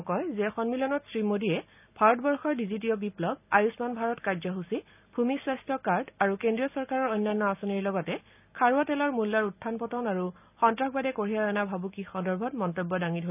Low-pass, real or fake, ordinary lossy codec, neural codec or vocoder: 3.6 kHz; real; none; none